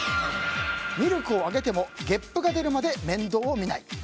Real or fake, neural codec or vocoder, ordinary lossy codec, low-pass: real; none; none; none